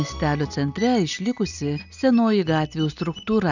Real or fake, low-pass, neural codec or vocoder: real; 7.2 kHz; none